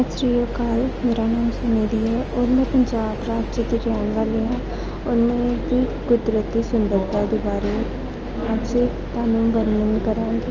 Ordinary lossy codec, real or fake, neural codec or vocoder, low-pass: Opus, 24 kbps; real; none; 7.2 kHz